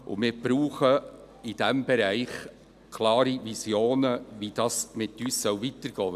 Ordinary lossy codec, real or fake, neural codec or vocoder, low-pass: none; real; none; 14.4 kHz